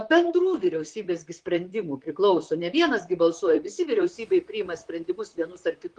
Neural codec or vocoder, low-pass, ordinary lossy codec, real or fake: vocoder, 44.1 kHz, 128 mel bands, Pupu-Vocoder; 9.9 kHz; Opus, 32 kbps; fake